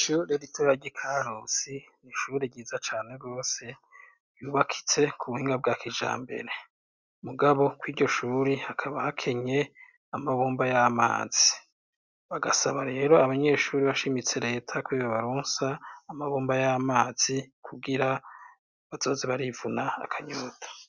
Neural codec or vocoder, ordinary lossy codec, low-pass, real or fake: none; Opus, 64 kbps; 7.2 kHz; real